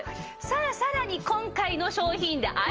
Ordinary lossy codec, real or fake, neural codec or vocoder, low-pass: Opus, 24 kbps; real; none; 7.2 kHz